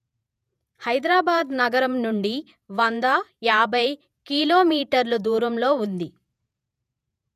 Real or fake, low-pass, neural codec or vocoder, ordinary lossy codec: fake; 14.4 kHz; vocoder, 48 kHz, 128 mel bands, Vocos; none